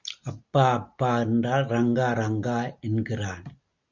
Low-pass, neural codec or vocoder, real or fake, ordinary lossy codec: 7.2 kHz; none; real; Opus, 64 kbps